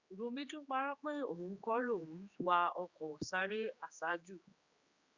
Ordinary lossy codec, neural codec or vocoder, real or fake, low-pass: Opus, 64 kbps; codec, 16 kHz, 2 kbps, X-Codec, HuBERT features, trained on general audio; fake; 7.2 kHz